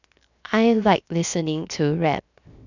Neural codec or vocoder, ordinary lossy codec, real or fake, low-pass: codec, 16 kHz, 0.7 kbps, FocalCodec; none; fake; 7.2 kHz